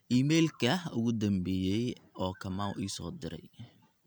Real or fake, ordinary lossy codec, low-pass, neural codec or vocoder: real; none; none; none